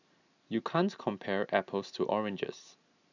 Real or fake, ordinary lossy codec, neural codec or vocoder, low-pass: real; none; none; 7.2 kHz